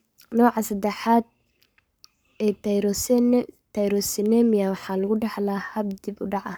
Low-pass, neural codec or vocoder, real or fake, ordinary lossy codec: none; codec, 44.1 kHz, 7.8 kbps, Pupu-Codec; fake; none